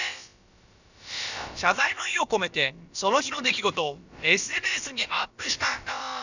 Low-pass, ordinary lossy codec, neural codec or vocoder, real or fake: 7.2 kHz; none; codec, 16 kHz, about 1 kbps, DyCAST, with the encoder's durations; fake